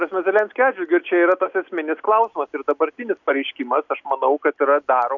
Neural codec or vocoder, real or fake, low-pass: none; real; 7.2 kHz